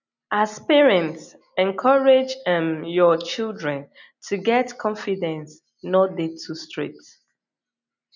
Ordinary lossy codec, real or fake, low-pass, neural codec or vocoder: none; real; 7.2 kHz; none